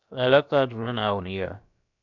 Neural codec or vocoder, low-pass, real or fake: codec, 16 kHz, about 1 kbps, DyCAST, with the encoder's durations; 7.2 kHz; fake